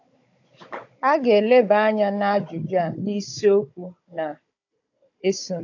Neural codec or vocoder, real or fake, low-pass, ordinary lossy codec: codec, 16 kHz, 16 kbps, FunCodec, trained on Chinese and English, 50 frames a second; fake; 7.2 kHz; AAC, 48 kbps